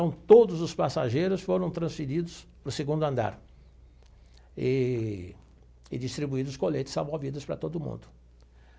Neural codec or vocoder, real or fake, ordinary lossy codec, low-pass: none; real; none; none